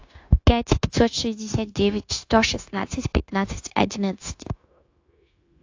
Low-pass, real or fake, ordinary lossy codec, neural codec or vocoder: 7.2 kHz; fake; AAC, 48 kbps; codec, 16 kHz, 0.9 kbps, LongCat-Audio-Codec